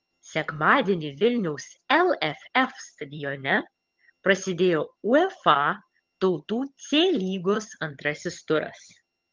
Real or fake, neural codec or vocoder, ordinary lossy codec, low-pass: fake; vocoder, 22.05 kHz, 80 mel bands, HiFi-GAN; Opus, 32 kbps; 7.2 kHz